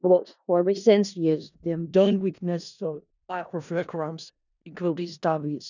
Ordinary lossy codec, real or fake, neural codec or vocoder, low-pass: none; fake; codec, 16 kHz in and 24 kHz out, 0.4 kbps, LongCat-Audio-Codec, four codebook decoder; 7.2 kHz